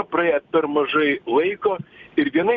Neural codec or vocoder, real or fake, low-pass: none; real; 7.2 kHz